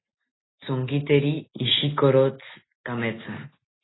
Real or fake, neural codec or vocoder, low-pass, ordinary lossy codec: real; none; 7.2 kHz; AAC, 16 kbps